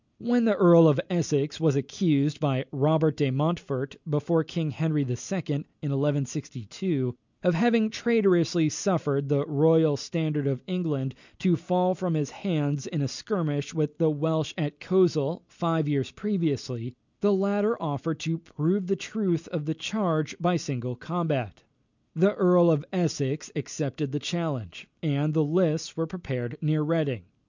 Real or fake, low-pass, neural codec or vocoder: real; 7.2 kHz; none